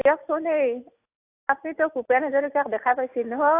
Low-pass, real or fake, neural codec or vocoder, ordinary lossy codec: 3.6 kHz; real; none; AAC, 24 kbps